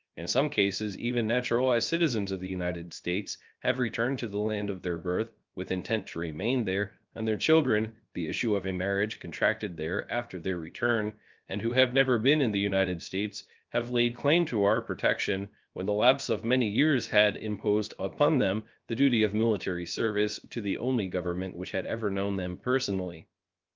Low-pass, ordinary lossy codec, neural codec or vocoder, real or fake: 7.2 kHz; Opus, 24 kbps; codec, 16 kHz, 0.7 kbps, FocalCodec; fake